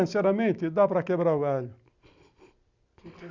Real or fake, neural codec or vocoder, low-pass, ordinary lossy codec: real; none; 7.2 kHz; none